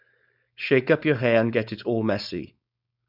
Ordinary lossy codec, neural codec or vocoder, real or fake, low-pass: none; codec, 16 kHz, 4.8 kbps, FACodec; fake; 5.4 kHz